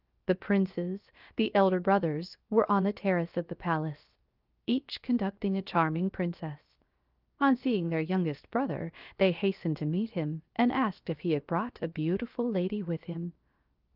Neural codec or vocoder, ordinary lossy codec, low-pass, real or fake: codec, 16 kHz, 0.7 kbps, FocalCodec; Opus, 24 kbps; 5.4 kHz; fake